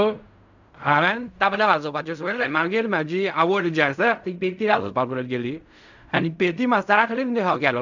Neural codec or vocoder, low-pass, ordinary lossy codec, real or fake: codec, 16 kHz in and 24 kHz out, 0.4 kbps, LongCat-Audio-Codec, fine tuned four codebook decoder; 7.2 kHz; none; fake